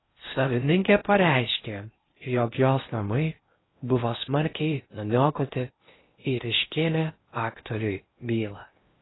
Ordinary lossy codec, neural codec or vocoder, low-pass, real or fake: AAC, 16 kbps; codec, 16 kHz in and 24 kHz out, 0.6 kbps, FocalCodec, streaming, 4096 codes; 7.2 kHz; fake